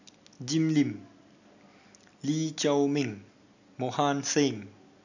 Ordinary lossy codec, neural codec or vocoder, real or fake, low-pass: none; none; real; 7.2 kHz